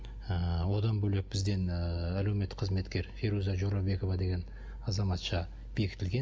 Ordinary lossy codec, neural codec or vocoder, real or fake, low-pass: none; none; real; none